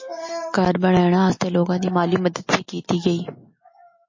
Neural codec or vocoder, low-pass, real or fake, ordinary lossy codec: none; 7.2 kHz; real; MP3, 32 kbps